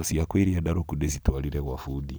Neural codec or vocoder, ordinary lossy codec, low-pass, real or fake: none; none; none; real